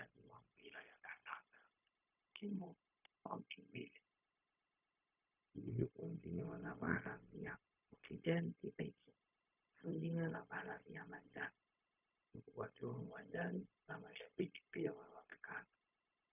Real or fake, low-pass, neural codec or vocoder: fake; 3.6 kHz; codec, 16 kHz, 0.4 kbps, LongCat-Audio-Codec